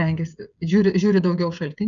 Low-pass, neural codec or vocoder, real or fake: 7.2 kHz; codec, 16 kHz, 16 kbps, FreqCodec, smaller model; fake